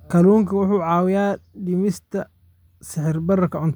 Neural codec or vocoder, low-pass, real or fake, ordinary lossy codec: none; none; real; none